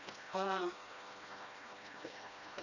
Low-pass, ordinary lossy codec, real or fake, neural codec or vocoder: 7.2 kHz; none; fake; codec, 16 kHz, 1 kbps, FreqCodec, smaller model